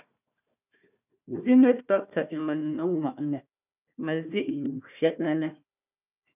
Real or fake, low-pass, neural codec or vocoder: fake; 3.6 kHz; codec, 16 kHz, 1 kbps, FunCodec, trained on Chinese and English, 50 frames a second